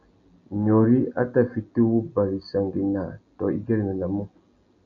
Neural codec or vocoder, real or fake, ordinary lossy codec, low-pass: none; real; MP3, 96 kbps; 7.2 kHz